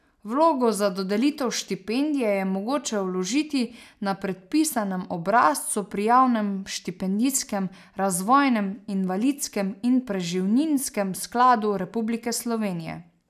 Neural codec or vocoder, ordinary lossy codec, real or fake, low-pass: none; none; real; 14.4 kHz